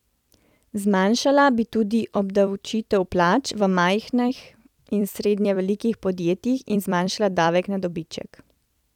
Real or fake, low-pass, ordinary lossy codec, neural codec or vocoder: fake; 19.8 kHz; none; vocoder, 44.1 kHz, 128 mel bands every 256 samples, BigVGAN v2